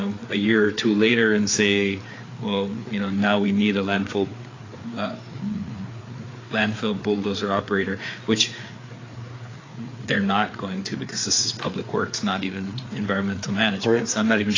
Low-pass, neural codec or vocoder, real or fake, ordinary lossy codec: 7.2 kHz; codec, 16 kHz, 4 kbps, FreqCodec, larger model; fake; AAC, 32 kbps